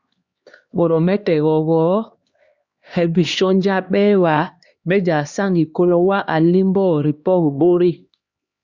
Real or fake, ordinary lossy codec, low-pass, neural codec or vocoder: fake; Opus, 64 kbps; 7.2 kHz; codec, 16 kHz, 2 kbps, X-Codec, HuBERT features, trained on LibriSpeech